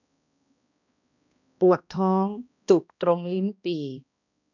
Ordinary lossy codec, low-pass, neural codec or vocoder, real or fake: none; 7.2 kHz; codec, 16 kHz, 1 kbps, X-Codec, HuBERT features, trained on balanced general audio; fake